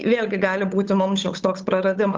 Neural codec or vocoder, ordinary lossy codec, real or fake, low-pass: codec, 16 kHz, 8 kbps, FunCodec, trained on Chinese and English, 25 frames a second; Opus, 24 kbps; fake; 7.2 kHz